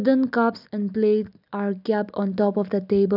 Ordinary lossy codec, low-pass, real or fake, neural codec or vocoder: none; 5.4 kHz; real; none